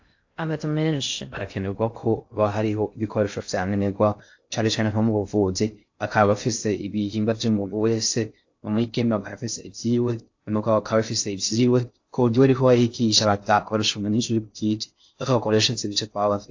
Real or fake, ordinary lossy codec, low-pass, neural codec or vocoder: fake; AAC, 48 kbps; 7.2 kHz; codec, 16 kHz in and 24 kHz out, 0.6 kbps, FocalCodec, streaming, 2048 codes